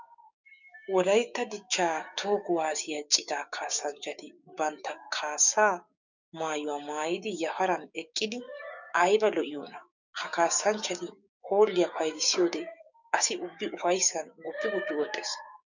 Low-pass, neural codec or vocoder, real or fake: 7.2 kHz; codec, 16 kHz, 6 kbps, DAC; fake